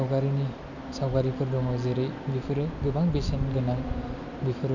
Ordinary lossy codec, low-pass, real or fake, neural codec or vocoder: none; 7.2 kHz; real; none